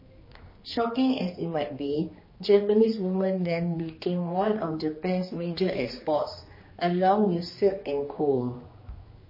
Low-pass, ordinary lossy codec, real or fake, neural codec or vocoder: 5.4 kHz; MP3, 24 kbps; fake; codec, 16 kHz, 2 kbps, X-Codec, HuBERT features, trained on general audio